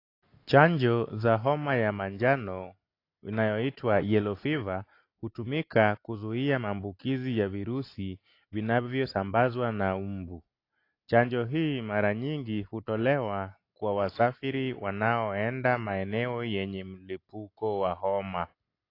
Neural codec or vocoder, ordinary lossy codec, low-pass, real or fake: none; AAC, 32 kbps; 5.4 kHz; real